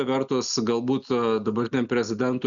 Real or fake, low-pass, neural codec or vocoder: real; 7.2 kHz; none